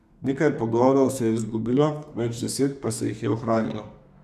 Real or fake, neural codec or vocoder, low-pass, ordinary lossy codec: fake; codec, 32 kHz, 1.9 kbps, SNAC; 14.4 kHz; none